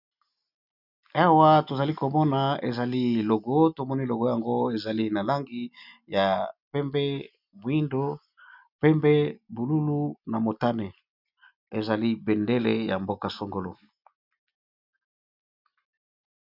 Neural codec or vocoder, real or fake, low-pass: none; real; 5.4 kHz